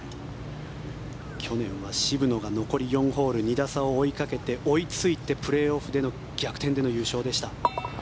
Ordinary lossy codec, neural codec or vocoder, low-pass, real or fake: none; none; none; real